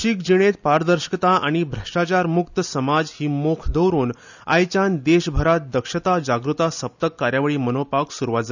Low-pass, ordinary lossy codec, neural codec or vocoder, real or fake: 7.2 kHz; none; none; real